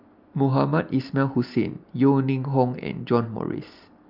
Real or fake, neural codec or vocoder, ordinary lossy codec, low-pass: real; none; Opus, 24 kbps; 5.4 kHz